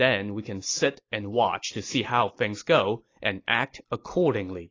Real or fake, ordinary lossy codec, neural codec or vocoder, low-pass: real; AAC, 32 kbps; none; 7.2 kHz